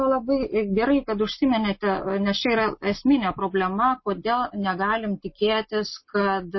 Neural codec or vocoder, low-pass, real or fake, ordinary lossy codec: none; 7.2 kHz; real; MP3, 24 kbps